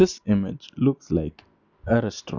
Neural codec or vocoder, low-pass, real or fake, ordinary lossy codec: none; 7.2 kHz; real; none